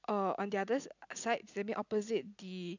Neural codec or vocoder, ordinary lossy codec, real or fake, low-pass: none; none; real; 7.2 kHz